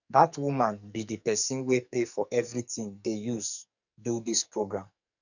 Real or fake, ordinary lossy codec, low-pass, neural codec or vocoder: fake; none; 7.2 kHz; codec, 44.1 kHz, 2.6 kbps, SNAC